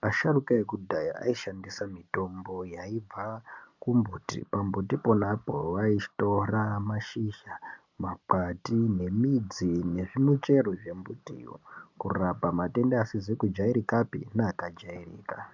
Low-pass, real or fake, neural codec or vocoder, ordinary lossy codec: 7.2 kHz; real; none; MP3, 64 kbps